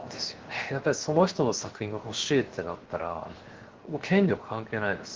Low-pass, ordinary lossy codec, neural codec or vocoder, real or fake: 7.2 kHz; Opus, 16 kbps; codec, 16 kHz, 0.7 kbps, FocalCodec; fake